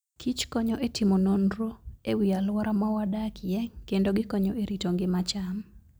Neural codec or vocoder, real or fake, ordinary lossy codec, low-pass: none; real; none; none